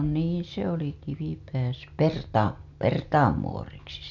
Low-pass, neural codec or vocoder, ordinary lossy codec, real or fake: 7.2 kHz; none; MP3, 64 kbps; real